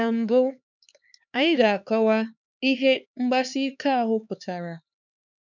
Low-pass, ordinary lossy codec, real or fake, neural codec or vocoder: 7.2 kHz; none; fake; codec, 16 kHz, 4 kbps, X-Codec, HuBERT features, trained on LibriSpeech